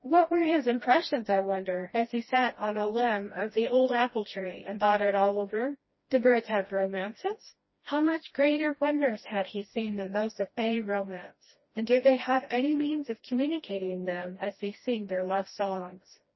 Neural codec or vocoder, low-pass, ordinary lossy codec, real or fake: codec, 16 kHz, 1 kbps, FreqCodec, smaller model; 7.2 kHz; MP3, 24 kbps; fake